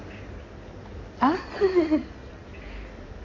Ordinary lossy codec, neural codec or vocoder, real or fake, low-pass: AAC, 32 kbps; codec, 16 kHz in and 24 kHz out, 2.2 kbps, FireRedTTS-2 codec; fake; 7.2 kHz